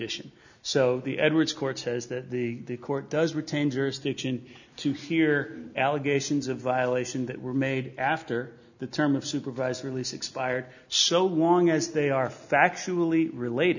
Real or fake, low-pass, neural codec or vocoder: real; 7.2 kHz; none